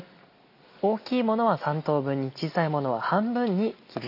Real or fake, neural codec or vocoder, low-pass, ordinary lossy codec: real; none; 5.4 kHz; none